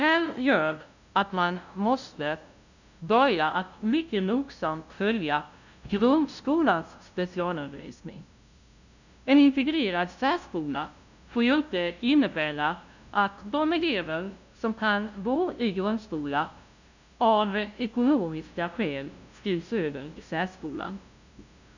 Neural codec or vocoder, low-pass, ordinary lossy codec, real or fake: codec, 16 kHz, 0.5 kbps, FunCodec, trained on LibriTTS, 25 frames a second; 7.2 kHz; none; fake